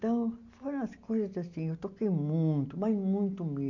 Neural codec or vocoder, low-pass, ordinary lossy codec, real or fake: none; 7.2 kHz; none; real